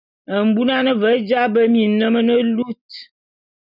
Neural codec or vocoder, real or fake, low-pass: none; real; 5.4 kHz